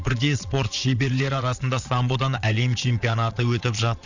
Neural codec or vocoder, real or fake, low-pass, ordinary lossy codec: vocoder, 22.05 kHz, 80 mel bands, Vocos; fake; 7.2 kHz; none